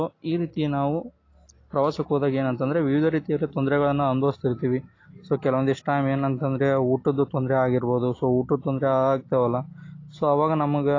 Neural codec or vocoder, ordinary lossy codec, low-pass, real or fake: none; AAC, 32 kbps; 7.2 kHz; real